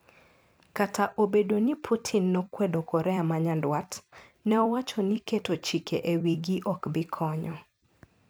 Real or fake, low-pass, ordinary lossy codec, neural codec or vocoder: fake; none; none; vocoder, 44.1 kHz, 128 mel bands every 256 samples, BigVGAN v2